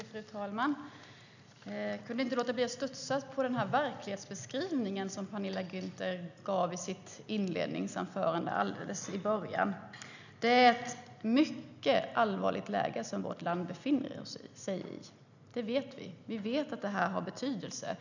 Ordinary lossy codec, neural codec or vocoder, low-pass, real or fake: none; none; 7.2 kHz; real